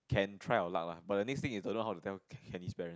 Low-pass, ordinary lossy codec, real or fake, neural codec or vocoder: none; none; real; none